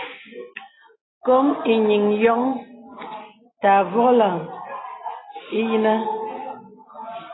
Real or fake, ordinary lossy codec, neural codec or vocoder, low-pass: real; AAC, 16 kbps; none; 7.2 kHz